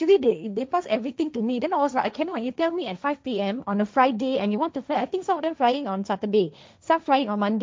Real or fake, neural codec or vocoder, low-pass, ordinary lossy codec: fake; codec, 16 kHz, 1.1 kbps, Voila-Tokenizer; none; none